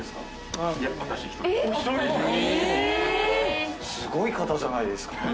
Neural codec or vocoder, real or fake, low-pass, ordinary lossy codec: none; real; none; none